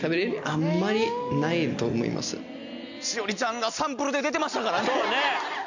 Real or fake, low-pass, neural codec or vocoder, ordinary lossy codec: real; 7.2 kHz; none; none